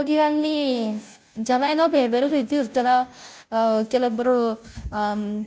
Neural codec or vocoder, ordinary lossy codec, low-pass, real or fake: codec, 16 kHz, 0.5 kbps, FunCodec, trained on Chinese and English, 25 frames a second; none; none; fake